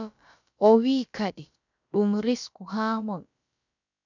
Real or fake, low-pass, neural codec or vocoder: fake; 7.2 kHz; codec, 16 kHz, about 1 kbps, DyCAST, with the encoder's durations